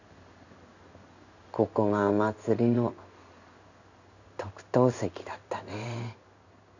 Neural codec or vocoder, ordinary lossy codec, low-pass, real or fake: codec, 16 kHz in and 24 kHz out, 1 kbps, XY-Tokenizer; none; 7.2 kHz; fake